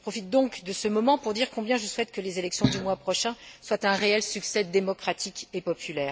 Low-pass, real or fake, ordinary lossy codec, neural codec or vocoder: none; real; none; none